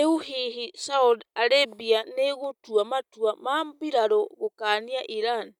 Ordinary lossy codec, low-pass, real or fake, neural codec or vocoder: none; 19.8 kHz; real; none